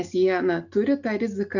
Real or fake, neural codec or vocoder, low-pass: real; none; 7.2 kHz